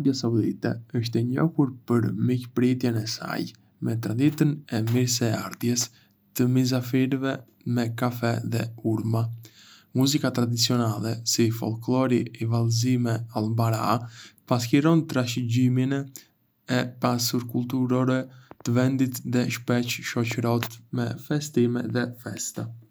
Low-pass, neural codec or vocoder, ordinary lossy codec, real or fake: none; none; none; real